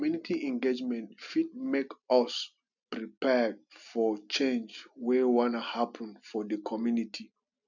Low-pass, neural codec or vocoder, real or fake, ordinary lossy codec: 7.2 kHz; none; real; none